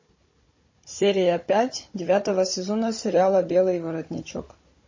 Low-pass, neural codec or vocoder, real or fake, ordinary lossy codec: 7.2 kHz; codec, 16 kHz, 16 kbps, FunCodec, trained on Chinese and English, 50 frames a second; fake; MP3, 32 kbps